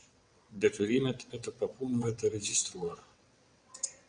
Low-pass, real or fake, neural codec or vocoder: 9.9 kHz; fake; vocoder, 22.05 kHz, 80 mel bands, WaveNeXt